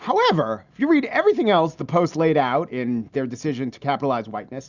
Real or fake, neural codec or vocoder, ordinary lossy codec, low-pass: real; none; Opus, 64 kbps; 7.2 kHz